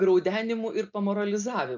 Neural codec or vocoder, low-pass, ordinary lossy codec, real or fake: none; 7.2 kHz; MP3, 64 kbps; real